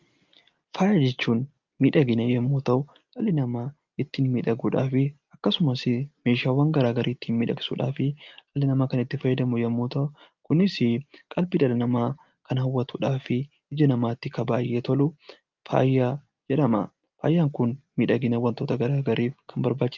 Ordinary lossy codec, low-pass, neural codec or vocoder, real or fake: Opus, 32 kbps; 7.2 kHz; none; real